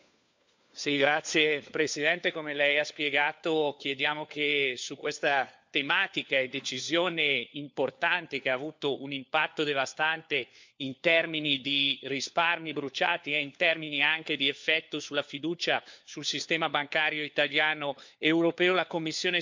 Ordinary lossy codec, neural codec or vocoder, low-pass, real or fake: MP3, 64 kbps; codec, 16 kHz, 4 kbps, FunCodec, trained on LibriTTS, 50 frames a second; 7.2 kHz; fake